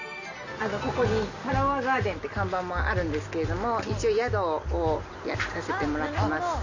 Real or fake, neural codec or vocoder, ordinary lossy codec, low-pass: real; none; Opus, 64 kbps; 7.2 kHz